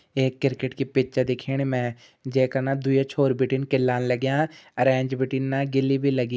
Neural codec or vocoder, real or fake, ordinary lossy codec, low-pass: none; real; none; none